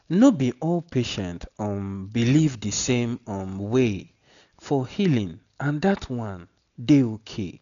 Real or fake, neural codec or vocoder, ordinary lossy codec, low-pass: real; none; none; 7.2 kHz